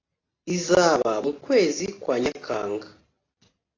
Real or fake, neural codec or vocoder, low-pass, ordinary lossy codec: real; none; 7.2 kHz; AAC, 32 kbps